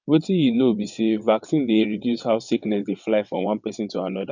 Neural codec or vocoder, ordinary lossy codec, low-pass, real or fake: vocoder, 22.05 kHz, 80 mel bands, WaveNeXt; none; 7.2 kHz; fake